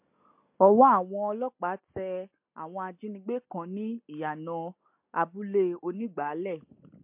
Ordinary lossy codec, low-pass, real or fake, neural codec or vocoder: MP3, 32 kbps; 3.6 kHz; real; none